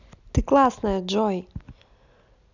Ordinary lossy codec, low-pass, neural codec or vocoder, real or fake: none; 7.2 kHz; none; real